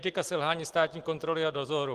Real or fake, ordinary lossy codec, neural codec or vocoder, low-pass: real; Opus, 16 kbps; none; 14.4 kHz